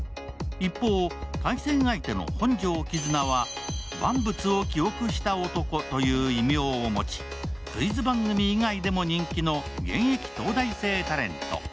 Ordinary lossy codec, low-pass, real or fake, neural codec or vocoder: none; none; real; none